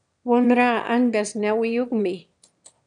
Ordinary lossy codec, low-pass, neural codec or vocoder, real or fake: MP3, 96 kbps; 9.9 kHz; autoencoder, 22.05 kHz, a latent of 192 numbers a frame, VITS, trained on one speaker; fake